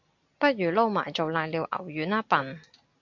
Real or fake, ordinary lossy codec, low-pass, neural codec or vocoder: real; AAC, 48 kbps; 7.2 kHz; none